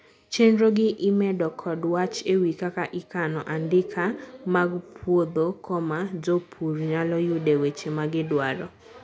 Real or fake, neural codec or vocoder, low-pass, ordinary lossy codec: real; none; none; none